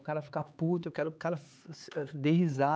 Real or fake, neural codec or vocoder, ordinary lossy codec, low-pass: fake; codec, 16 kHz, 4 kbps, X-Codec, HuBERT features, trained on LibriSpeech; none; none